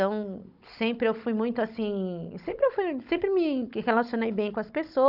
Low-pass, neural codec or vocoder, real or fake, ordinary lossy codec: 5.4 kHz; codec, 16 kHz, 16 kbps, FunCodec, trained on Chinese and English, 50 frames a second; fake; none